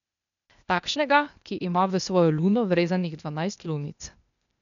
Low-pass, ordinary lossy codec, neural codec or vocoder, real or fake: 7.2 kHz; none; codec, 16 kHz, 0.8 kbps, ZipCodec; fake